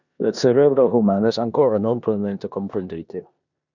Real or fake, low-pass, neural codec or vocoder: fake; 7.2 kHz; codec, 16 kHz in and 24 kHz out, 0.9 kbps, LongCat-Audio-Codec, four codebook decoder